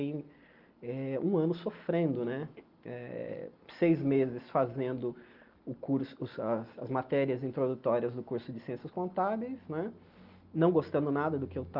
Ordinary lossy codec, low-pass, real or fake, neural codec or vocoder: Opus, 32 kbps; 5.4 kHz; real; none